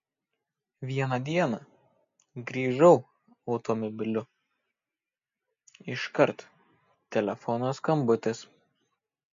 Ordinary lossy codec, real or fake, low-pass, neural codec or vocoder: MP3, 48 kbps; real; 7.2 kHz; none